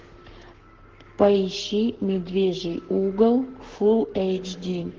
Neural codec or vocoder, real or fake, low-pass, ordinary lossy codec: codec, 44.1 kHz, 7.8 kbps, Pupu-Codec; fake; 7.2 kHz; Opus, 16 kbps